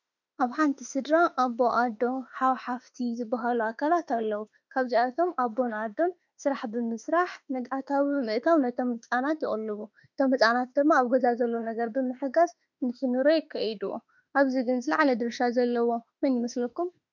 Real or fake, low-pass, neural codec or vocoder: fake; 7.2 kHz; autoencoder, 48 kHz, 32 numbers a frame, DAC-VAE, trained on Japanese speech